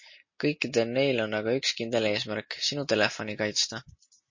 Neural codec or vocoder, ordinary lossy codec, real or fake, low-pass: none; MP3, 32 kbps; real; 7.2 kHz